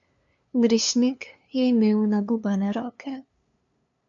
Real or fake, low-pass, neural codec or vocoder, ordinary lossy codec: fake; 7.2 kHz; codec, 16 kHz, 2 kbps, FunCodec, trained on LibriTTS, 25 frames a second; MP3, 48 kbps